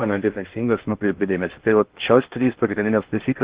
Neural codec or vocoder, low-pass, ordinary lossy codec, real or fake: codec, 16 kHz in and 24 kHz out, 0.6 kbps, FocalCodec, streaming, 2048 codes; 3.6 kHz; Opus, 16 kbps; fake